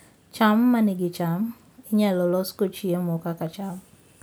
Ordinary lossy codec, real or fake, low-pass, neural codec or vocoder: none; real; none; none